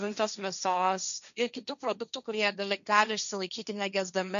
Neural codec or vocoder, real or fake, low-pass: codec, 16 kHz, 1.1 kbps, Voila-Tokenizer; fake; 7.2 kHz